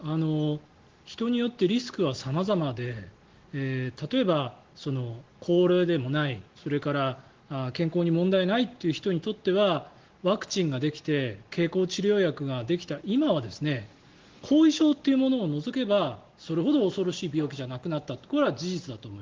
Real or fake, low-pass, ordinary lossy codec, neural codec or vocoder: real; 7.2 kHz; Opus, 16 kbps; none